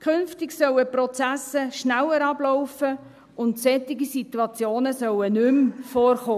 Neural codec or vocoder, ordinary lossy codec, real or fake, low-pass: none; none; real; 14.4 kHz